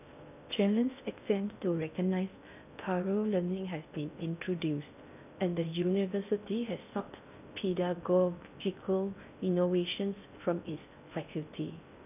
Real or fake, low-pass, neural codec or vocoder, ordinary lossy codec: fake; 3.6 kHz; codec, 16 kHz in and 24 kHz out, 0.6 kbps, FocalCodec, streaming, 2048 codes; none